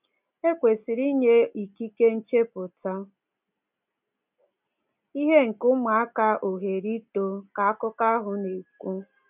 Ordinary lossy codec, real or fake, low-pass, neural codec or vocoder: none; real; 3.6 kHz; none